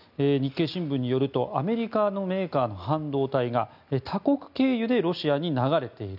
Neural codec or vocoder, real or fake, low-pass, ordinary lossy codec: none; real; 5.4 kHz; none